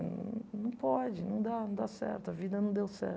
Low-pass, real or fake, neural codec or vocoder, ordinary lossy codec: none; real; none; none